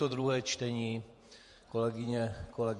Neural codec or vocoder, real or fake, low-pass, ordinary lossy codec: vocoder, 48 kHz, 128 mel bands, Vocos; fake; 14.4 kHz; MP3, 48 kbps